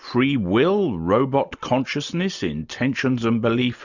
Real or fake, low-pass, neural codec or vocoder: real; 7.2 kHz; none